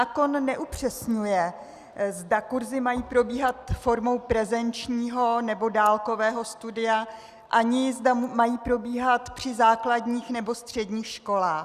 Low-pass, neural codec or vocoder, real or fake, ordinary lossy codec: 14.4 kHz; none; real; Opus, 64 kbps